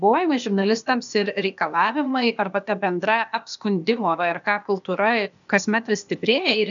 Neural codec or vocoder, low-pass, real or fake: codec, 16 kHz, 0.8 kbps, ZipCodec; 7.2 kHz; fake